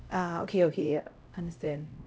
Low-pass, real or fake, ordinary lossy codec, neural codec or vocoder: none; fake; none; codec, 16 kHz, 0.5 kbps, X-Codec, HuBERT features, trained on LibriSpeech